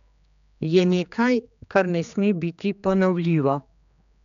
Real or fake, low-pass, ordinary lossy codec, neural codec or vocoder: fake; 7.2 kHz; none; codec, 16 kHz, 2 kbps, X-Codec, HuBERT features, trained on general audio